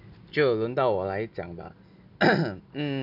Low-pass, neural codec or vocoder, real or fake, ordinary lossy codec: 5.4 kHz; none; real; none